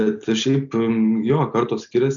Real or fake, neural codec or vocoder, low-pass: real; none; 7.2 kHz